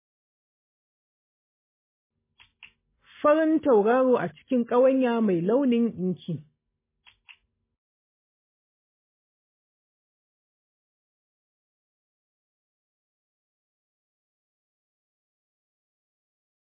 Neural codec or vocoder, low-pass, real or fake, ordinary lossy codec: none; 3.6 kHz; real; MP3, 16 kbps